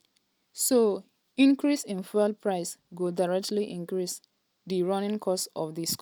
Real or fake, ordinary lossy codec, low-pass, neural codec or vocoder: real; none; none; none